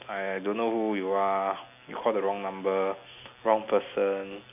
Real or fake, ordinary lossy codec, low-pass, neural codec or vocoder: real; none; 3.6 kHz; none